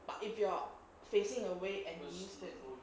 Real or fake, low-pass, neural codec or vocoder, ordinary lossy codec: real; none; none; none